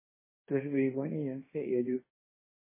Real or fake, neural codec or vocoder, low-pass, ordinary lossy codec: fake; codec, 16 kHz, 1.1 kbps, Voila-Tokenizer; 3.6 kHz; MP3, 16 kbps